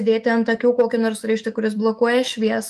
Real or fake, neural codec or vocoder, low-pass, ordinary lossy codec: fake; autoencoder, 48 kHz, 128 numbers a frame, DAC-VAE, trained on Japanese speech; 14.4 kHz; Opus, 32 kbps